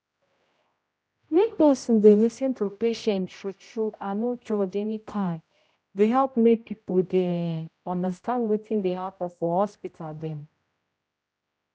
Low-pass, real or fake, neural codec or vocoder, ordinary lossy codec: none; fake; codec, 16 kHz, 0.5 kbps, X-Codec, HuBERT features, trained on general audio; none